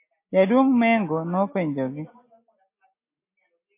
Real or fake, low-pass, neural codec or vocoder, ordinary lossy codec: real; 3.6 kHz; none; MP3, 24 kbps